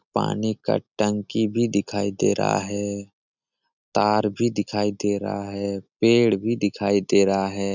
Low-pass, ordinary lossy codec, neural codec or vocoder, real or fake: 7.2 kHz; none; none; real